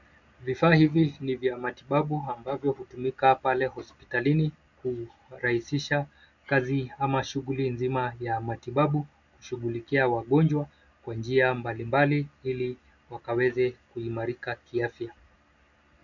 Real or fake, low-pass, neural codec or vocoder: real; 7.2 kHz; none